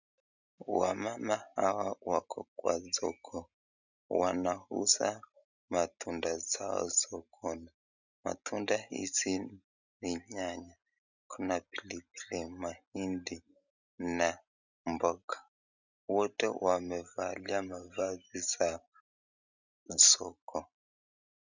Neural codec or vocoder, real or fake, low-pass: none; real; 7.2 kHz